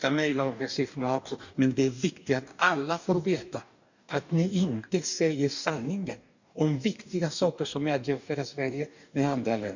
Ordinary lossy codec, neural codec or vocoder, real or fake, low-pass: none; codec, 44.1 kHz, 2.6 kbps, DAC; fake; 7.2 kHz